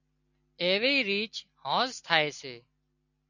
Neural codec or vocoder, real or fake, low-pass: none; real; 7.2 kHz